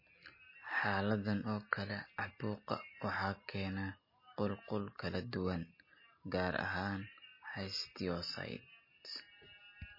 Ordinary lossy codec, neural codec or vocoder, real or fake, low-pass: MP3, 24 kbps; none; real; 5.4 kHz